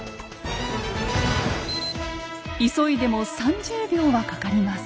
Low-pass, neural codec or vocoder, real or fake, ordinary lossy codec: none; none; real; none